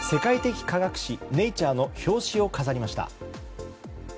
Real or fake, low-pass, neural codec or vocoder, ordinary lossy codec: real; none; none; none